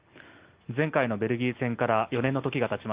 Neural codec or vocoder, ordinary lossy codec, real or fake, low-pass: none; Opus, 32 kbps; real; 3.6 kHz